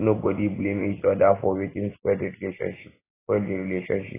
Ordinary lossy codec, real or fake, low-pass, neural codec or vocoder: AAC, 16 kbps; real; 3.6 kHz; none